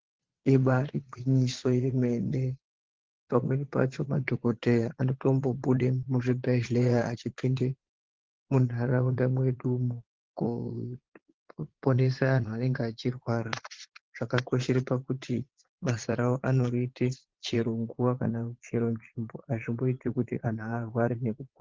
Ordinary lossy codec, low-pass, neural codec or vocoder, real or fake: Opus, 16 kbps; 7.2 kHz; vocoder, 22.05 kHz, 80 mel bands, Vocos; fake